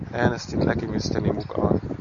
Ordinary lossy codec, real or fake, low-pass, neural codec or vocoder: AAC, 64 kbps; real; 7.2 kHz; none